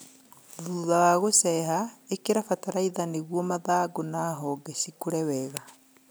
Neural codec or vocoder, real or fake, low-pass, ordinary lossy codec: none; real; none; none